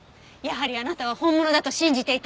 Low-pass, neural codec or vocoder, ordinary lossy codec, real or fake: none; none; none; real